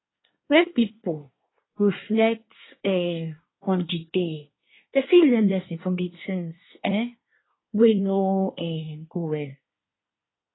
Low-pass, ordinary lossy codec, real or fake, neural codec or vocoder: 7.2 kHz; AAC, 16 kbps; fake; codec, 24 kHz, 1 kbps, SNAC